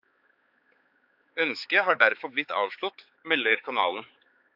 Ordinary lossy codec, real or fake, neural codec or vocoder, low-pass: AAC, 48 kbps; fake; codec, 16 kHz, 4 kbps, X-Codec, HuBERT features, trained on balanced general audio; 5.4 kHz